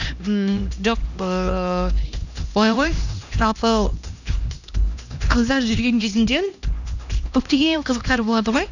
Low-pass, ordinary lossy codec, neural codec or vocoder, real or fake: 7.2 kHz; none; codec, 16 kHz, 1 kbps, X-Codec, HuBERT features, trained on LibriSpeech; fake